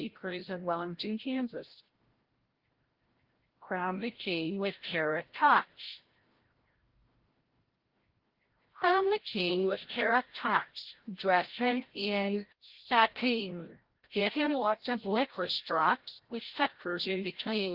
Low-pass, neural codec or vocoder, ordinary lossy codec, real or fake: 5.4 kHz; codec, 16 kHz, 0.5 kbps, FreqCodec, larger model; Opus, 16 kbps; fake